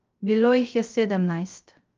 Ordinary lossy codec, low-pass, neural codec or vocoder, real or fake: Opus, 24 kbps; 7.2 kHz; codec, 16 kHz, 0.3 kbps, FocalCodec; fake